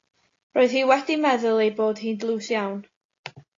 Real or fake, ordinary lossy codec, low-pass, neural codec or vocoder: real; AAC, 32 kbps; 7.2 kHz; none